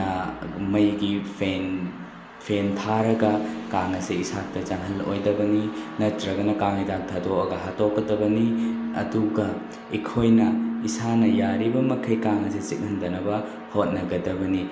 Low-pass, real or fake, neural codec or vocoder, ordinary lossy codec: none; real; none; none